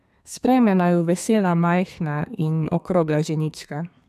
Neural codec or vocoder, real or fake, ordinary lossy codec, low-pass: codec, 32 kHz, 1.9 kbps, SNAC; fake; none; 14.4 kHz